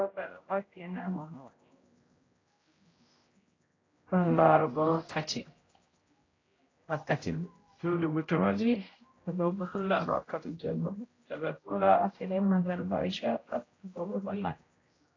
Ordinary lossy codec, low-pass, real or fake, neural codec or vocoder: AAC, 32 kbps; 7.2 kHz; fake; codec, 16 kHz, 0.5 kbps, X-Codec, HuBERT features, trained on general audio